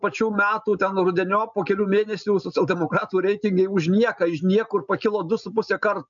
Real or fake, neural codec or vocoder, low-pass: real; none; 7.2 kHz